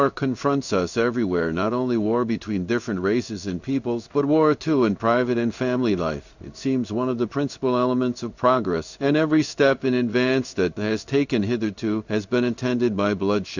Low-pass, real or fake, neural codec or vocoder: 7.2 kHz; fake; codec, 16 kHz in and 24 kHz out, 1 kbps, XY-Tokenizer